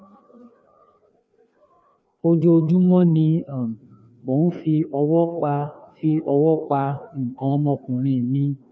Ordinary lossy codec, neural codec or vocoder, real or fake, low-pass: none; codec, 16 kHz, 2 kbps, FreqCodec, larger model; fake; none